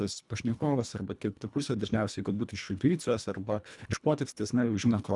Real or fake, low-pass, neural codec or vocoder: fake; 10.8 kHz; codec, 24 kHz, 1.5 kbps, HILCodec